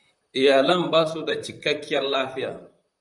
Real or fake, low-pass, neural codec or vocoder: fake; 10.8 kHz; vocoder, 44.1 kHz, 128 mel bands, Pupu-Vocoder